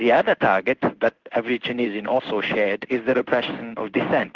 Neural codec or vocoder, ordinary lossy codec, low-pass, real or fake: codec, 16 kHz in and 24 kHz out, 1 kbps, XY-Tokenizer; Opus, 16 kbps; 7.2 kHz; fake